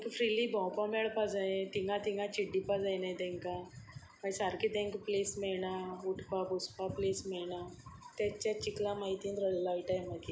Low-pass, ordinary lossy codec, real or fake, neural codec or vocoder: none; none; real; none